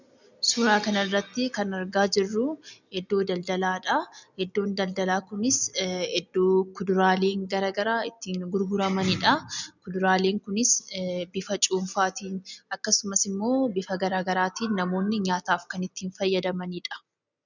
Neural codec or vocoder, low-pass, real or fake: none; 7.2 kHz; real